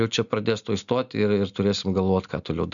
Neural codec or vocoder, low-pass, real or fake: none; 7.2 kHz; real